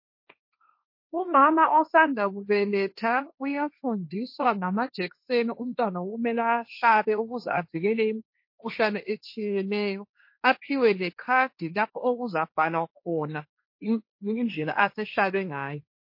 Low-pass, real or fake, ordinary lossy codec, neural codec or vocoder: 5.4 kHz; fake; MP3, 32 kbps; codec, 16 kHz, 1.1 kbps, Voila-Tokenizer